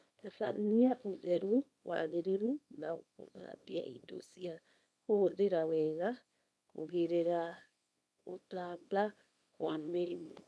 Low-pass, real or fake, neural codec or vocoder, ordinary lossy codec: none; fake; codec, 24 kHz, 0.9 kbps, WavTokenizer, small release; none